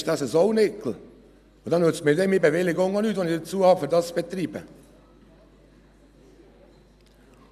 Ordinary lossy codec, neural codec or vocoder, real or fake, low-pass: none; none; real; 14.4 kHz